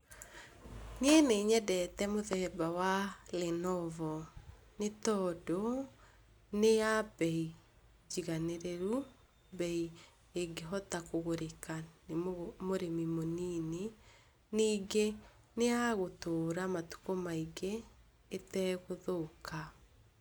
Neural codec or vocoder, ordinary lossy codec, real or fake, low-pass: none; none; real; none